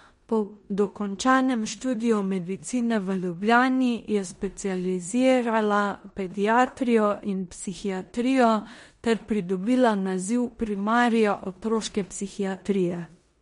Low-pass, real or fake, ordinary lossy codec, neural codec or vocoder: 10.8 kHz; fake; MP3, 48 kbps; codec, 16 kHz in and 24 kHz out, 0.9 kbps, LongCat-Audio-Codec, four codebook decoder